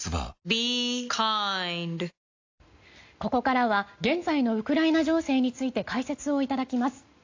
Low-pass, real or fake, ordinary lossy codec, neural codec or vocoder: 7.2 kHz; real; AAC, 48 kbps; none